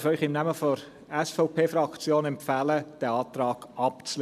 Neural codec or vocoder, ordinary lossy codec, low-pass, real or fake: none; none; 14.4 kHz; real